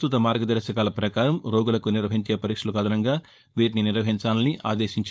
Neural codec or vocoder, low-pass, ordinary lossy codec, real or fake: codec, 16 kHz, 4.8 kbps, FACodec; none; none; fake